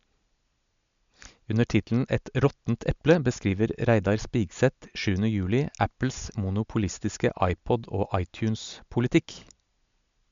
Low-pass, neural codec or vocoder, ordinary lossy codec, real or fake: 7.2 kHz; none; MP3, 64 kbps; real